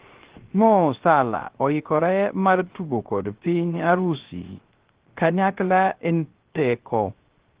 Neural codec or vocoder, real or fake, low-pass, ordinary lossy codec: codec, 16 kHz, 0.3 kbps, FocalCodec; fake; 3.6 kHz; Opus, 16 kbps